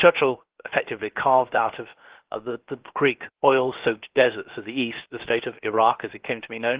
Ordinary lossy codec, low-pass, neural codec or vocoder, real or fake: Opus, 16 kbps; 3.6 kHz; codec, 16 kHz, about 1 kbps, DyCAST, with the encoder's durations; fake